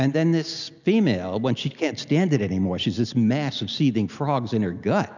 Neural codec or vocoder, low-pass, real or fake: none; 7.2 kHz; real